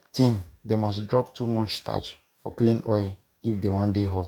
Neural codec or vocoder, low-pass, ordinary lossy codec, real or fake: codec, 44.1 kHz, 2.6 kbps, DAC; 19.8 kHz; none; fake